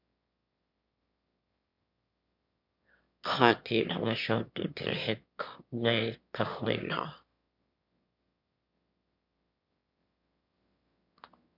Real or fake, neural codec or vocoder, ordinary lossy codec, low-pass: fake; autoencoder, 22.05 kHz, a latent of 192 numbers a frame, VITS, trained on one speaker; MP3, 48 kbps; 5.4 kHz